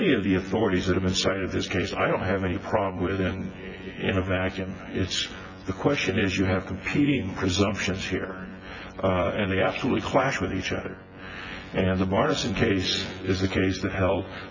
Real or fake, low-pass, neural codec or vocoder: fake; 7.2 kHz; vocoder, 24 kHz, 100 mel bands, Vocos